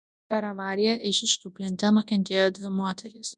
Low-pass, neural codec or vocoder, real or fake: 10.8 kHz; codec, 24 kHz, 0.9 kbps, WavTokenizer, large speech release; fake